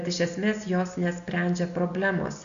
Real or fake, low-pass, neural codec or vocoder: real; 7.2 kHz; none